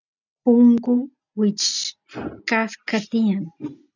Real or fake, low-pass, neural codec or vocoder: real; 7.2 kHz; none